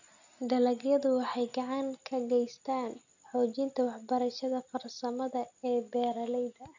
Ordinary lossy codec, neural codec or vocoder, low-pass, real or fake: none; none; 7.2 kHz; real